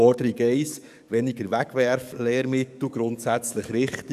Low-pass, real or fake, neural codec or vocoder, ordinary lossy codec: 14.4 kHz; fake; codec, 44.1 kHz, 7.8 kbps, DAC; none